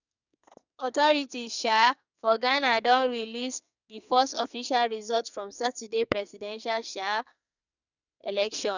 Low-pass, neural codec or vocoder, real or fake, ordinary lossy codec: 7.2 kHz; codec, 44.1 kHz, 2.6 kbps, SNAC; fake; none